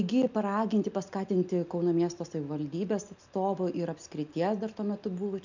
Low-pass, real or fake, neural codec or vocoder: 7.2 kHz; real; none